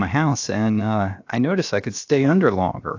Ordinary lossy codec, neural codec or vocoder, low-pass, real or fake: AAC, 48 kbps; codec, 16 kHz, 0.7 kbps, FocalCodec; 7.2 kHz; fake